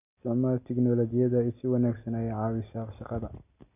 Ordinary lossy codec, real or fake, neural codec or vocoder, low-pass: none; real; none; 3.6 kHz